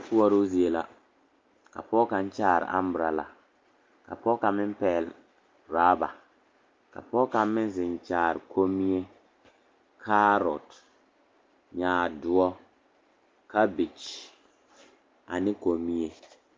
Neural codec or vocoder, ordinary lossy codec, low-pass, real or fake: none; Opus, 24 kbps; 7.2 kHz; real